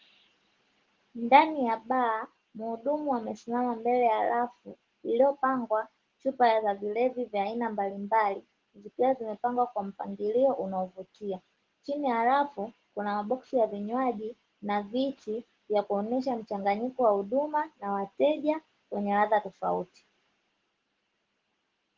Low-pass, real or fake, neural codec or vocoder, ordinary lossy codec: 7.2 kHz; real; none; Opus, 16 kbps